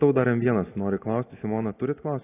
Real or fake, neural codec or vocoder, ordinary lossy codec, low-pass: real; none; AAC, 24 kbps; 3.6 kHz